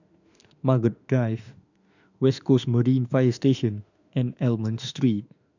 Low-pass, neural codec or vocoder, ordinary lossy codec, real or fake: 7.2 kHz; autoencoder, 48 kHz, 32 numbers a frame, DAC-VAE, trained on Japanese speech; none; fake